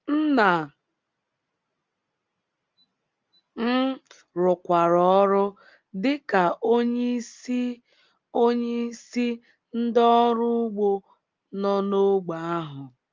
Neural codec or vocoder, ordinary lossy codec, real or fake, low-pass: none; Opus, 32 kbps; real; 7.2 kHz